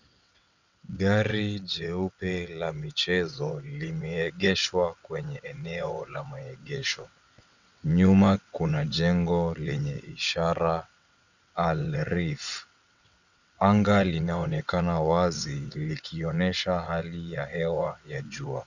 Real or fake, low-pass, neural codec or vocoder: fake; 7.2 kHz; vocoder, 22.05 kHz, 80 mel bands, WaveNeXt